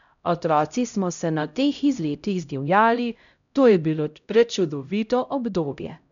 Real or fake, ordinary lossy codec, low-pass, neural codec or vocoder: fake; none; 7.2 kHz; codec, 16 kHz, 0.5 kbps, X-Codec, HuBERT features, trained on LibriSpeech